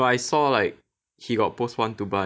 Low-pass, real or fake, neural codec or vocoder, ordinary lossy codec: none; real; none; none